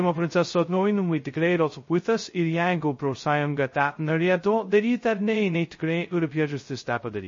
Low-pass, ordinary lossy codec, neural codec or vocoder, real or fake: 7.2 kHz; MP3, 32 kbps; codec, 16 kHz, 0.2 kbps, FocalCodec; fake